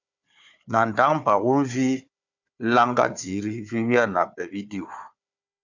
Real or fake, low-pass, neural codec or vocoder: fake; 7.2 kHz; codec, 16 kHz, 4 kbps, FunCodec, trained on Chinese and English, 50 frames a second